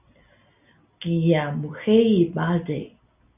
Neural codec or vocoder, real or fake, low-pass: none; real; 3.6 kHz